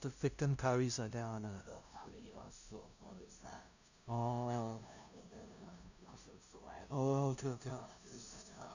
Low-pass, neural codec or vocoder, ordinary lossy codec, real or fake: 7.2 kHz; codec, 16 kHz, 0.5 kbps, FunCodec, trained on LibriTTS, 25 frames a second; none; fake